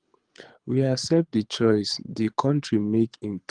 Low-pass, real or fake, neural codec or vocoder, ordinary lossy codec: 9.9 kHz; fake; codec, 24 kHz, 6 kbps, HILCodec; Opus, 24 kbps